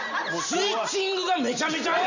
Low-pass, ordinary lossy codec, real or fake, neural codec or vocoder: 7.2 kHz; none; real; none